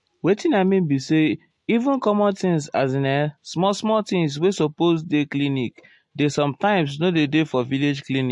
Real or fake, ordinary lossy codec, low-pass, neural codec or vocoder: real; MP3, 48 kbps; 10.8 kHz; none